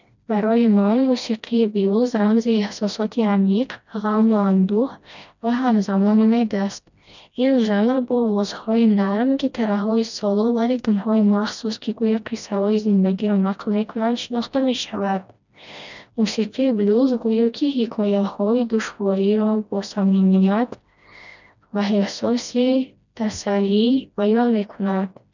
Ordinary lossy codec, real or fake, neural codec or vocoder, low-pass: none; fake; codec, 16 kHz, 1 kbps, FreqCodec, smaller model; 7.2 kHz